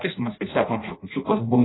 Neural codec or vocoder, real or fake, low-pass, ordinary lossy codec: codec, 16 kHz in and 24 kHz out, 0.6 kbps, FireRedTTS-2 codec; fake; 7.2 kHz; AAC, 16 kbps